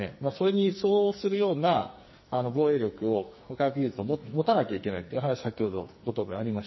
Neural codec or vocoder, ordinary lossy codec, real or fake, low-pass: codec, 44.1 kHz, 2.6 kbps, SNAC; MP3, 24 kbps; fake; 7.2 kHz